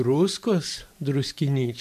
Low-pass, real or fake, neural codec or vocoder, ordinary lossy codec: 14.4 kHz; fake; vocoder, 44.1 kHz, 128 mel bands every 512 samples, BigVGAN v2; MP3, 64 kbps